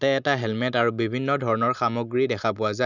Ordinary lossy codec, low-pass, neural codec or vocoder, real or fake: none; 7.2 kHz; none; real